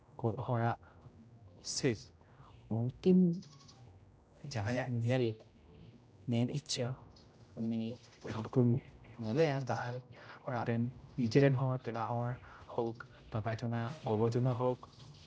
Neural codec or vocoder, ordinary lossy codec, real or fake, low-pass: codec, 16 kHz, 0.5 kbps, X-Codec, HuBERT features, trained on general audio; none; fake; none